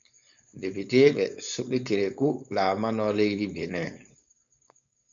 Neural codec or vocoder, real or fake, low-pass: codec, 16 kHz, 4.8 kbps, FACodec; fake; 7.2 kHz